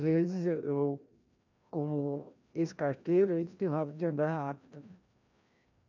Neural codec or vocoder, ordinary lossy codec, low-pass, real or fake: codec, 16 kHz, 1 kbps, FreqCodec, larger model; none; 7.2 kHz; fake